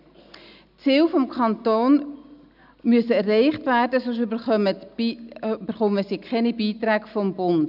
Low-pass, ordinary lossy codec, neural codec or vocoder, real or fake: 5.4 kHz; none; none; real